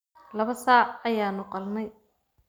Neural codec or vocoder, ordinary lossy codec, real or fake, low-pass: none; none; real; none